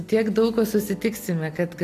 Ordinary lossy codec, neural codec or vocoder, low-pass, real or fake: AAC, 64 kbps; none; 14.4 kHz; real